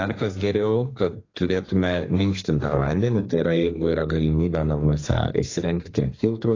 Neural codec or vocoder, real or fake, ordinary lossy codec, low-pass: codec, 32 kHz, 1.9 kbps, SNAC; fake; AAC, 32 kbps; 7.2 kHz